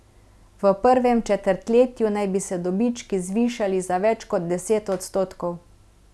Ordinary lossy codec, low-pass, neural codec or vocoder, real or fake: none; none; none; real